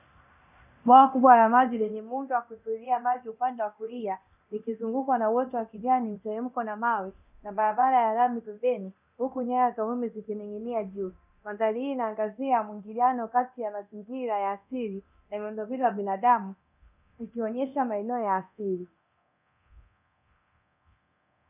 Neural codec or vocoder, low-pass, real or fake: codec, 24 kHz, 0.9 kbps, DualCodec; 3.6 kHz; fake